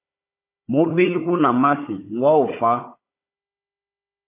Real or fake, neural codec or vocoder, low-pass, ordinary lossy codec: fake; codec, 16 kHz, 4 kbps, FunCodec, trained on Chinese and English, 50 frames a second; 3.6 kHz; AAC, 24 kbps